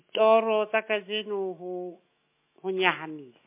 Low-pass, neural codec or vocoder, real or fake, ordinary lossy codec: 3.6 kHz; autoencoder, 48 kHz, 128 numbers a frame, DAC-VAE, trained on Japanese speech; fake; MP3, 24 kbps